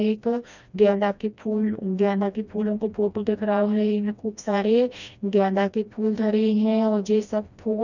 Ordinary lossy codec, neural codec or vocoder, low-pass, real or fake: none; codec, 16 kHz, 1 kbps, FreqCodec, smaller model; 7.2 kHz; fake